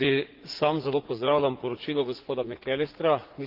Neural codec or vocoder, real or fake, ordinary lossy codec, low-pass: vocoder, 44.1 kHz, 80 mel bands, Vocos; fake; Opus, 16 kbps; 5.4 kHz